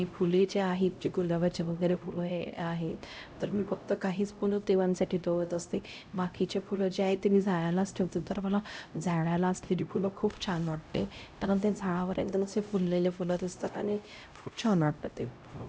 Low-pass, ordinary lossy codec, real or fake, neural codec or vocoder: none; none; fake; codec, 16 kHz, 0.5 kbps, X-Codec, HuBERT features, trained on LibriSpeech